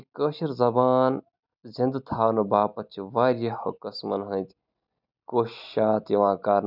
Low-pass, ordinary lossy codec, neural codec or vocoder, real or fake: 5.4 kHz; none; none; real